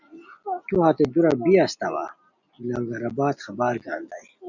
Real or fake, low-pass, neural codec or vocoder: real; 7.2 kHz; none